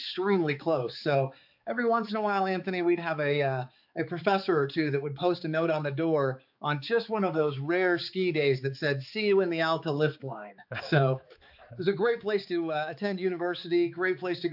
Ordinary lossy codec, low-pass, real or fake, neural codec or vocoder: AAC, 48 kbps; 5.4 kHz; fake; codec, 16 kHz, 4 kbps, X-Codec, HuBERT features, trained on balanced general audio